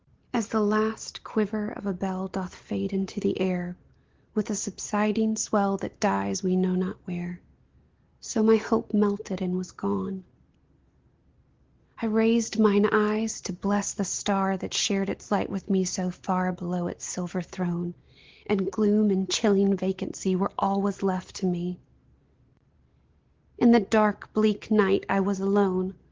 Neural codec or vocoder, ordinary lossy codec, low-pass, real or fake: none; Opus, 16 kbps; 7.2 kHz; real